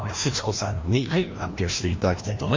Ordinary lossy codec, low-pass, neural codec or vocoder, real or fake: MP3, 48 kbps; 7.2 kHz; codec, 16 kHz, 1 kbps, FreqCodec, larger model; fake